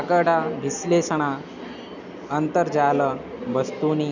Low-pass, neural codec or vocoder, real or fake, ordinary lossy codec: 7.2 kHz; none; real; none